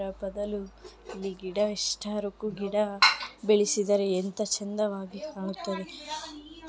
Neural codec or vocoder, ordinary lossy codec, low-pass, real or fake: none; none; none; real